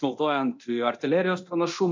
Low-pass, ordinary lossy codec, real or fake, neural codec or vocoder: 7.2 kHz; MP3, 48 kbps; fake; codec, 24 kHz, 0.9 kbps, DualCodec